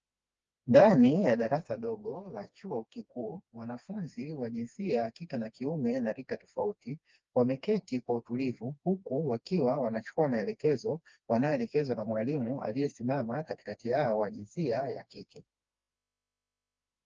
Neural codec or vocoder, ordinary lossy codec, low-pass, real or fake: codec, 16 kHz, 2 kbps, FreqCodec, smaller model; Opus, 24 kbps; 7.2 kHz; fake